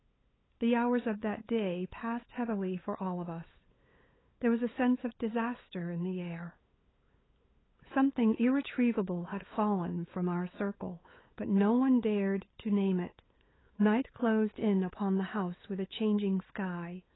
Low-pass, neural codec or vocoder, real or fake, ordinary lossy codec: 7.2 kHz; codec, 16 kHz, 8 kbps, FunCodec, trained on LibriTTS, 25 frames a second; fake; AAC, 16 kbps